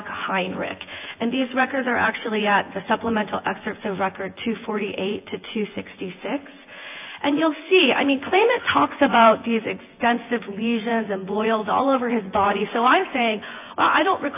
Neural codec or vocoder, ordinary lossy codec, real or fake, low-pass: vocoder, 24 kHz, 100 mel bands, Vocos; AAC, 24 kbps; fake; 3.6 kHz